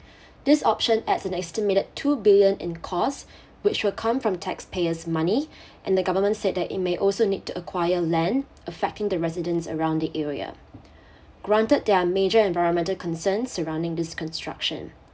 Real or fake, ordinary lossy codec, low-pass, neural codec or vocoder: real; none; none; none